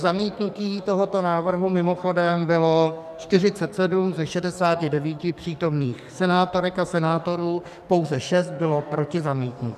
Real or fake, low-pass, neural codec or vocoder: fake; 14.4 kHz; codec, 44.1 kHz, 2.6 kbps, SNAC